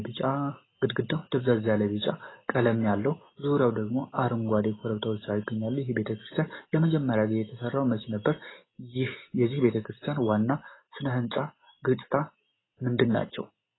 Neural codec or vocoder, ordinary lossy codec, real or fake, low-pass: none; AAC, 16 kbps; real; 7.2 kHz